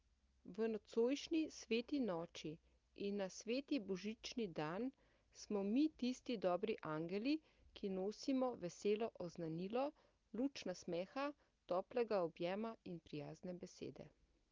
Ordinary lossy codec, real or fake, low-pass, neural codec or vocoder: Opus, 32 kbps; real; 7.2 kHz; none